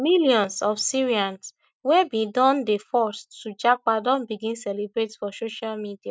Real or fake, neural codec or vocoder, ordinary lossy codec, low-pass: real; none; none; none